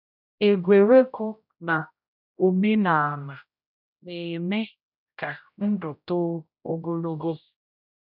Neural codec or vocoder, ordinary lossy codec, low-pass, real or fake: codec, 16 kHz, 0.5 kbps, X-Codec, HuBERT features, trained on general audio; none; 5.4 kHz; fake